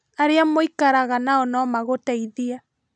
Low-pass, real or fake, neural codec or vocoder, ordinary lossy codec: none; real; none; none